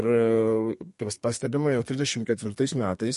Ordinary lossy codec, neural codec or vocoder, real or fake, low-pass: MP3, 48 kbps; codec, 32 kHz, 1.9 kbps, SNAC; fake; 14.4 kHz